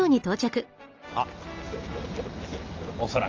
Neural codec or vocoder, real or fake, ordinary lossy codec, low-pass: none; real; Opus, 24 kbps; 7.2 kHz